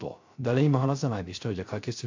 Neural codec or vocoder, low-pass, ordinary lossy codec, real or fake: codec, 16 kHz, 0.3 kbps, FocalCodec; 7.2 kHz; AAC, 48 kbps; fake